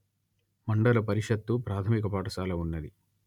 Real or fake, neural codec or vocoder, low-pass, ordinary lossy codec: real; none; 19.8 kHz; none